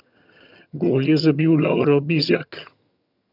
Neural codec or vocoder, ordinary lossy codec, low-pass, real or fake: vocoder, 22.05 kHz, 80 mel bands, HiFi-GAN; none; 5.4 kHz; fake